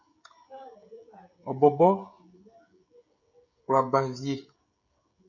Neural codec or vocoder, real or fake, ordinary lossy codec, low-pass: codec, 16 kHz, 16 kbps, FreqCodec, smaller model; fake; MP3, 64 kbps; 7.2 kHz